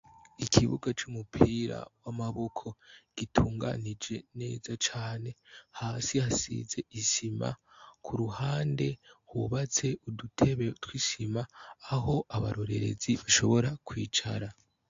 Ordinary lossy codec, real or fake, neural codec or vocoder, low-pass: AAC, 48 kbps; real; none; 7.2 kHz